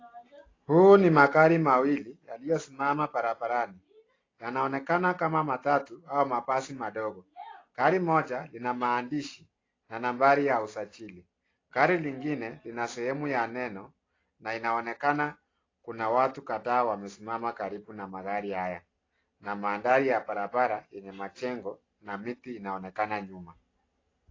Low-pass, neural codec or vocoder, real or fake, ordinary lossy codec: 7.2 kHz; none; real; AAC, 32 kbps